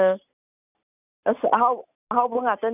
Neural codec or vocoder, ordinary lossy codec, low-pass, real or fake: none; none; 3.6 kHz; real